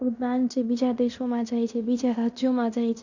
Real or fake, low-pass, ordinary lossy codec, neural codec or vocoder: fake; 7.2 kHz; none; codec, 16 kHz in and 24 kHz out, 0.9 kbps, LongCat-Audio-Codec, fine tuned four codebook decoder